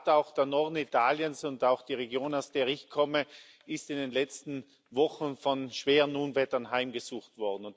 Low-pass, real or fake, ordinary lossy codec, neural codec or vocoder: none; real; none; none